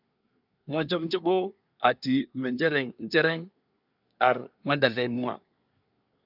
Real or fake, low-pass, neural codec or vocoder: fake; 5.4 kHz; codec, 24 kHz, 1 kbps, SNAC